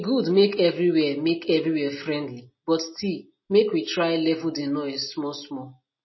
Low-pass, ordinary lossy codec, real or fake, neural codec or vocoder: 7.2 kHz; MP3, 24 kbps; real; none